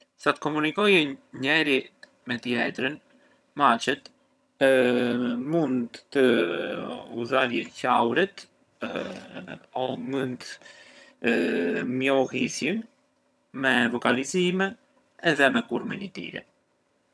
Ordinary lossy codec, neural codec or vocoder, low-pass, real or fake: none; vocoder, 22.05 kHz, 80 mel bands, HiFi-GAN; none; fake